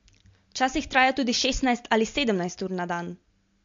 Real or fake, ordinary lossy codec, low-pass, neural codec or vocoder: real; MP3, 48 kbps; 7.2 kHz; none